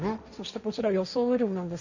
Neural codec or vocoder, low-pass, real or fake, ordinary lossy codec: codec, 16 kHz, 1.1 kbps, Voila-Tokenizer; 7.2 kHz; fake; none